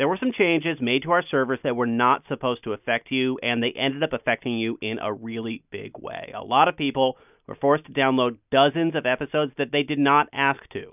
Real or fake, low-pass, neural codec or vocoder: real; 3.6 kHz; none